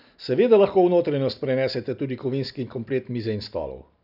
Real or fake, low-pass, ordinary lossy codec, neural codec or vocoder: real; 5.4 kHz; none; none